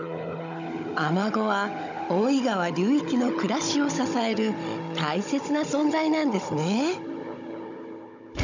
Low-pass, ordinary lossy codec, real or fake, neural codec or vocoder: 7.2 kHz; none; fake; codec, 16 kHz, 16 kbps, FunCodec, trained on Chinese and English, 50 frames a second